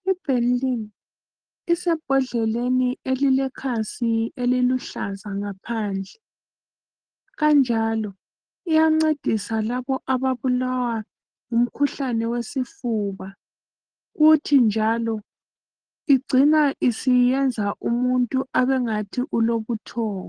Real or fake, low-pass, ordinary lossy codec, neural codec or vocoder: real; 9.9 kHz; Opus, 16 kbps; none